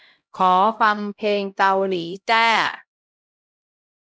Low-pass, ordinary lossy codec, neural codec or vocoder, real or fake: none; none; codec, 16 kHz, 0.5 kbps, X-Codec, HuBERT features, trained on LibriSpeech; fake